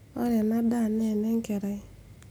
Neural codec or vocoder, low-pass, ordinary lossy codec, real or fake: none; none; none; real